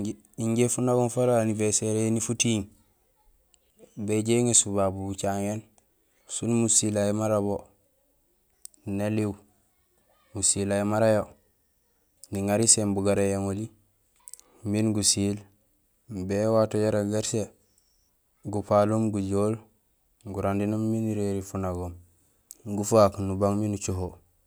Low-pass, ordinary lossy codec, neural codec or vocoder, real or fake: none; none; none; real